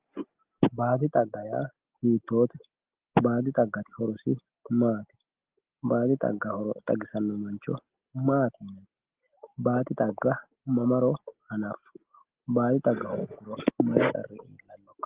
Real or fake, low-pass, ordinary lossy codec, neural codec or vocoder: real; 3.6 kHz; Opus, 16 kbps; none